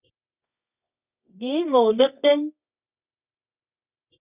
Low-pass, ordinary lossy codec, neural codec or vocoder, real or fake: 3.6 kHz; Opus, 64 kbps; codec, 24 kHz, 0.9 kbps, WavTokenizer, medium music audio release; fake